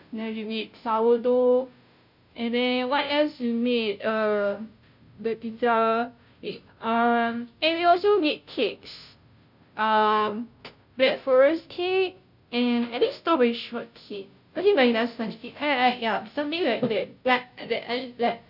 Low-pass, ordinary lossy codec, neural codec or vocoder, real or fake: 5.4 kHz; none; codec, 16 kHz, 0.5 kbps, FunCodec, trained on Chinese and English, 25 frames a second; fake